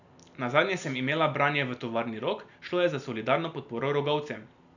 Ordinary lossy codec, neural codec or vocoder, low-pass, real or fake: none; none; 7.2 kHz; real